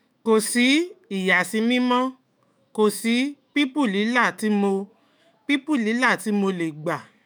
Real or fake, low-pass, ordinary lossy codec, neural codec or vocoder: fake; none; none; autoencoder, 48 kHz, 128 numbers a frame, DAC-VAE, trained on Japanese speech